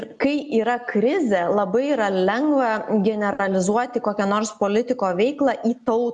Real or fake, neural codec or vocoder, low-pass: real; none; 10.8 kHz